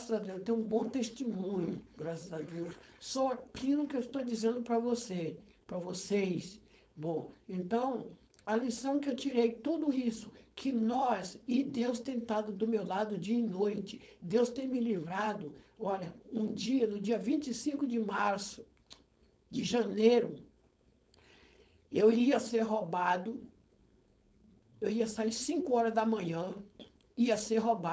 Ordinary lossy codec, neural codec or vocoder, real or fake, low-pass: none; codec, 16 kHz, 4.8 kbps, FACodec; fake; none